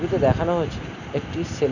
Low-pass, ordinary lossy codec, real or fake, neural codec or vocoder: 7.2 kHz; none; real; none